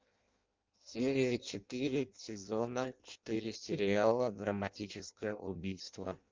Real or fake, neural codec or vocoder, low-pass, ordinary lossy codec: fake; codec, 16 kHz in and 24 kHz out, 0.6 kbps, FireRedTTS-2 codec; 7.2 kHz; Opus, 24 kbps